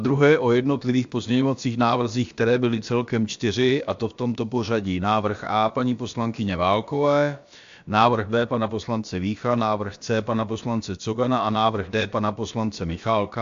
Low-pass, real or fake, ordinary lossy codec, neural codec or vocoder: 7.2 kHz; fake; AAC, 64 kbps; codec, 16 kHz, about 1 kbps, DyCAST, with the encoder's durations